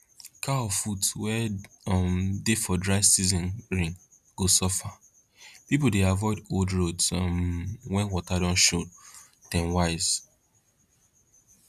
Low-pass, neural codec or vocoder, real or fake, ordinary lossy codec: 14.4 kHz; none; real; none